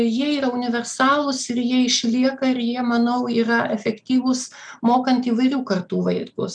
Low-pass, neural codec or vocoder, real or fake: 9.9 kHz; none; real